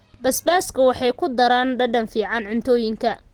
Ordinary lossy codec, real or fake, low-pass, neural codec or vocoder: Opus, 16 kbps; real; 19.8 kHz; none